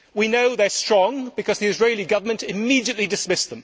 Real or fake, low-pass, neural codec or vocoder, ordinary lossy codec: real; none; none; none